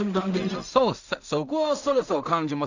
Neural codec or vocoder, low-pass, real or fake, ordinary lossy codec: codec, 16 kHz in and 24 kHz out, 0.4 kbps, LongCat-Audio-Codec, two codebook decoder; 7.2 kHz; fake; Opus, 64 kbps